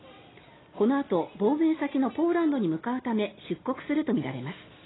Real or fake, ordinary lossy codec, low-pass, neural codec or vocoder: real; AAC, 16 kbps; 7.2 kHz; none